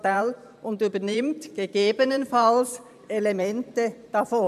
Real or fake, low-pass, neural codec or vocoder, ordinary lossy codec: fake; 14.4 kHz; vocoder, 44.1 kHz, 128 mel bands every 512 samples, BigVGAN v2; AAC, 96 kbps